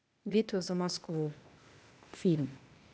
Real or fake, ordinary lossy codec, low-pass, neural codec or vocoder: fake; none; none; codec, 16 kHz, 0.8 kbps, ZipCodec